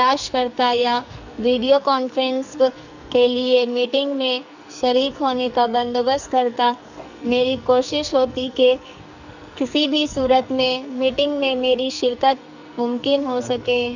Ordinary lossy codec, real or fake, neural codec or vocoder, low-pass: none; fake; codec, 44.1 kHz, 2.6 kbps, SNAC; 7.2 kHz